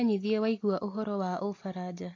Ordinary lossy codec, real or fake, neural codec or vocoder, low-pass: AAC, 32 kbps; real; none; 7.2 kHz